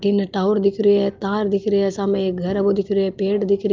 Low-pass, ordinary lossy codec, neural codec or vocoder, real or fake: 7.2 kHz; Opus, 32 kbps; none; real